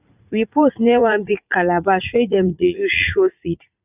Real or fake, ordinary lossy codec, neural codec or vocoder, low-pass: fake; none; vocoder, 44.1 kHz, 80 mel bands, Vocos; 3.6 kHz